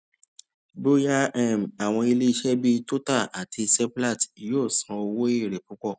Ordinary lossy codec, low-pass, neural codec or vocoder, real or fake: none; none; none; real